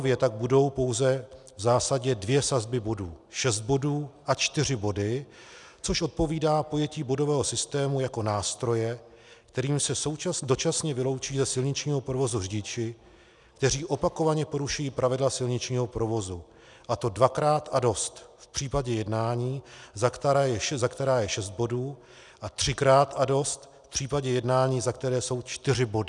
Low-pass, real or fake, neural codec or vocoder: 10.8 kHz; real; none